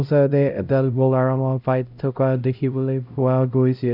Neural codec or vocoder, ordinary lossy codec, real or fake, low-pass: codec, 16 kHz, 0.5 kbps, X-Codec, HuBERT features, trained on LibriSpeech; none; fake; 5.4 kHz